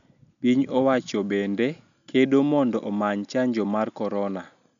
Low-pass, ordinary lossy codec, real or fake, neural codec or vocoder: 7.2 kHz; none; real; none